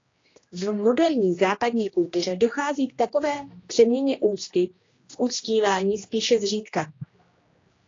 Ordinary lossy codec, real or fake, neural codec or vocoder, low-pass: AAC, 32 kbps; fake; codec, 16 kHz, 1 kbps, X-Codec, HuBERT features, trained on general audio; 7.2 kHz